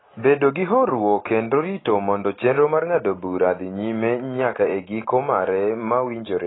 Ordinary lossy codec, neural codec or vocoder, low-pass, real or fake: AAC, 16 kbps; none; 7.2 kHz; real